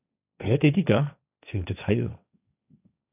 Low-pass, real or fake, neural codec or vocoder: 3.6 kHz; fake; codec, 16 kHz, 1.1 kbps, Voila-Tokenizer